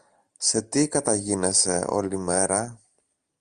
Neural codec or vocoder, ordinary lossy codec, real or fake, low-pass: none; Opus, 32 kbps; real; 9.9 kHz